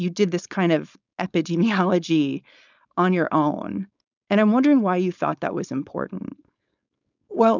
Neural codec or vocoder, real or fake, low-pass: codec, 16 kHz, 4.8 kbps, FACodec; fake; 7.2 kHz